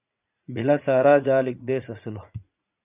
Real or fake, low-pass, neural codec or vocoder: fake; 3.6 kHz; vocoder, 44.1 kHz, 80 mel bands, Vocos